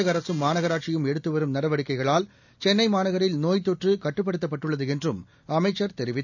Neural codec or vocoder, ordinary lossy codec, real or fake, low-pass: none; none; real; 7.2 kHz